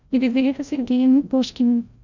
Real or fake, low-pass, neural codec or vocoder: fake; 7.2 kHz; codec, 16 kHz, 0.5 kbps, FreqCodec, larger model